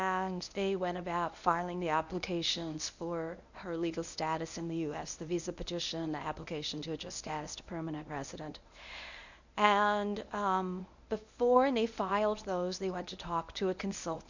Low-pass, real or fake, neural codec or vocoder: 7.2 kHz; fake; codec, 24 kHz, 0.9 kbps, WavTokenizer, medium speech release version 1